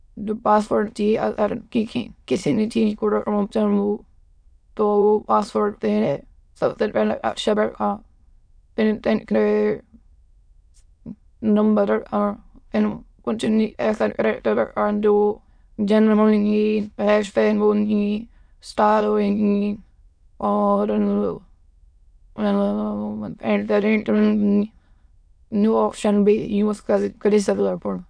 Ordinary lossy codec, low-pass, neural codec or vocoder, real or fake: none; 9.9 kHz; autoencoder, 22.05 kHz, a latent of 192 numbers a frame, VITS, trained on many speakers; fake